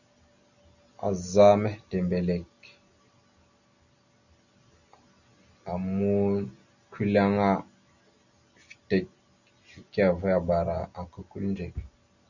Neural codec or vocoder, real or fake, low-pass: none; real; 7.2 kHz